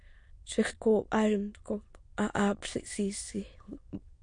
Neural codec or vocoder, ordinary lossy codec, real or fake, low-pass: autoencoder, 22.05 kHz, a latent of 192 numbers a frame, VITS, trained on many speakers; MP3, 48 kbps; fake; 9.9 kHz